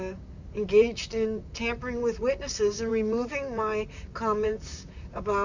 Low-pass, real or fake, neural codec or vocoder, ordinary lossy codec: 7.2 kHz; fake; vocoder, 44.1 kHz, 128 mel bands, Pupu-Vocoder; Opus, 64 kbps